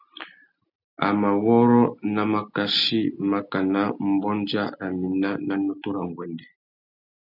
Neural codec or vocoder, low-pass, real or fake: none; 5.4 kHz; real